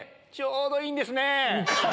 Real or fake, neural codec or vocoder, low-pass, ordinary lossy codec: real; none; none; none